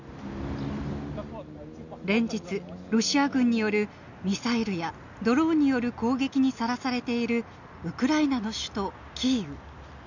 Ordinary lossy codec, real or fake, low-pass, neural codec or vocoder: none; real; 7.2 kHz; none